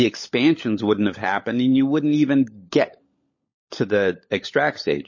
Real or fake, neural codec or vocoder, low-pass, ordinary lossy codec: fake; codec, 16 kHz, 16 kbps, FunCodec, trained on LibriTTS, 50 frames a second; 7.2 kHz; MP3, 32 kbps